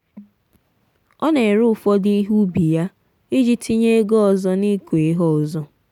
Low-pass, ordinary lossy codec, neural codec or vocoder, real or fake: 19.8 kHz; none; none; real